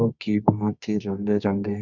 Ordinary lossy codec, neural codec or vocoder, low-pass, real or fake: none; codec, 24 kHz, 0.9 kbps, WavTokenizer, medium music audio release; 7.2 kHz; fake